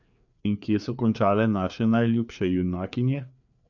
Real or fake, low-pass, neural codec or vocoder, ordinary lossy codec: fake; 7.2 kHz; codec, 16 kHz, 4 kbps, FreqCodec, larger model; none